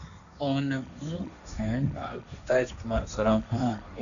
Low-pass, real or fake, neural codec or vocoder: 7.2 kHz; fake; codec, 16 kHz, 1.1 kbps, Voila-Tokenizer